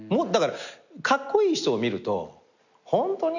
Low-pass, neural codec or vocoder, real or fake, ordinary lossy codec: 7.2 kHz; none; real; none